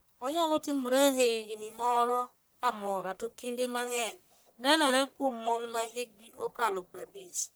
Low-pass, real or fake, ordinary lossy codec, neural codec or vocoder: none; fake; none; codec, 44.1 kHz, 1.7 kbps, Pupu-Codec